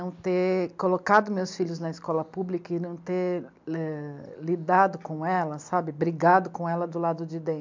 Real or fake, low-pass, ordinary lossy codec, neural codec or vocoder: real; 7.2 kHz; MP3, 64 kbps; none